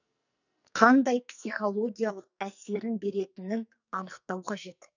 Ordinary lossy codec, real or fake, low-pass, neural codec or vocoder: none; fake; 7.2 kHz; codec, 44.1 kHz, 2.6 kbps, SNAC